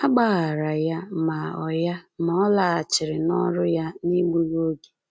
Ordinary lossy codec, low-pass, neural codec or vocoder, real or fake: none; none; none; real